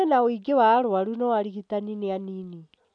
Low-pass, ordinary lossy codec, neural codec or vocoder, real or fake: 9.9 kHz; none; none; real